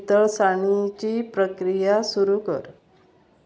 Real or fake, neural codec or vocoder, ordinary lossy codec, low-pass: real; none; none; none